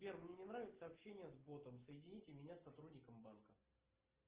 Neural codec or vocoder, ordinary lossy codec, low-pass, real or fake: none; Opus, 16 kbps; 3.6 kHz; real